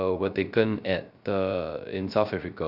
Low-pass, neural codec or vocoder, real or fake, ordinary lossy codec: 5.4 kHz; codec, 16 kHz, 0.3 kbps, FocalCodec; fake; Opus, 64 kbps